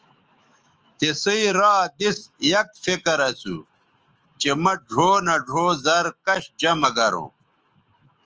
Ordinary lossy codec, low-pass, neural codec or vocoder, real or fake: Opus, 24 kbps; 7.2 kHz; autoencoder, 48 kHz, 128 numbers a frame, DAC-VAE, trained on Japanese speech; fake